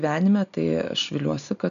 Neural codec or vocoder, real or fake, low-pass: none; real; 7.2 kHz